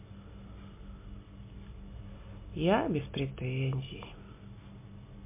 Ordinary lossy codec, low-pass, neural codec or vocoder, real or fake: MP3, 24 kbps; 3.6 kHz; none; real